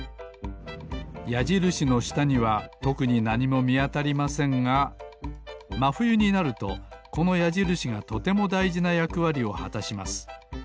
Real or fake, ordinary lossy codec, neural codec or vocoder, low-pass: real; none; none; none